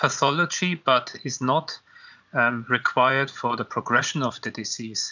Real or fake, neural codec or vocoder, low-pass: fake; vocoder, 44.1 kHz, 80 mel bands, Vocos; 7.2 kHz